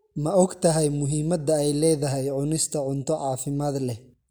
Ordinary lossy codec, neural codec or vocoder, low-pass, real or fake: none; none; none; real